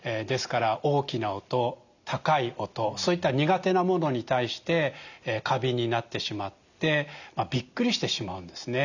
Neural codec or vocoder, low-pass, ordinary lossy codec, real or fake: none; 7.2 kHz; none; real